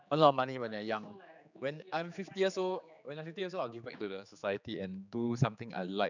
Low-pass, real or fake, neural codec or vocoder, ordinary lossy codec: 7.2 kHz; fake; codec, 16 kHz, 4 kbps, X-Codec, HuBERT features, trained on general audio; none